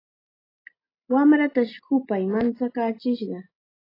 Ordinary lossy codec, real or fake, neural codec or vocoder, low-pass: AAC, 24 kbps; real; none; 5.4 kHz